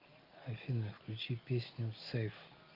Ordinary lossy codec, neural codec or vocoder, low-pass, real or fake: Opus, 24 kbps; none; 5.4 kHz; real